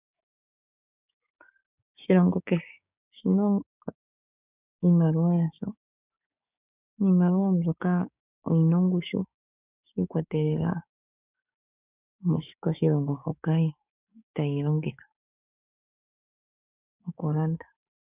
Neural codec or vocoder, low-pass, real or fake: codec, 16 kHz, 6 kbps, DAC; 3.6 kHz; fake